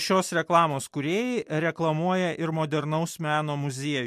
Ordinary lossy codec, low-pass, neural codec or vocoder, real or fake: MP3, 64 kbps; 14.4 kHz; none; real